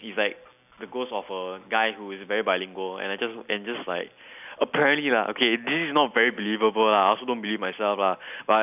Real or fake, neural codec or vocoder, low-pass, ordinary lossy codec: real; none; 3.6 kHz; none